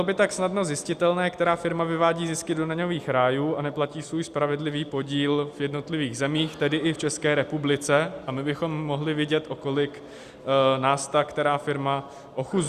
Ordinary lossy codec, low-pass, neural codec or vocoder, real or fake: Opus, 64 kbps; 14.4 kHz; none; real